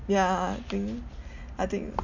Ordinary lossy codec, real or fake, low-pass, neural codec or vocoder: MP3, 64 kbps; real; 7.2 kHz; none